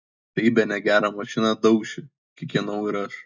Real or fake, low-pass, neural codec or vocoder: real; 7.2 kHz; none